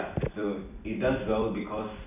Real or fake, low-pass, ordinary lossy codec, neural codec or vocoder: fake; 3.6 kHz; none; vocoder, 44.1 kHz, 128 mel bands every 256 samples, BigVGAN v2